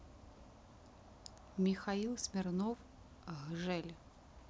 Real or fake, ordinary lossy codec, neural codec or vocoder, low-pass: real; none; none; none